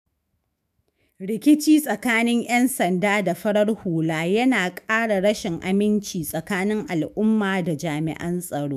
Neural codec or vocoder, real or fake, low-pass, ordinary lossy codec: autoencoder, 48 kHz, 128 numbers a frame, DAC-VAE, trained on Japanese speech; fake; 14.4 kHz; none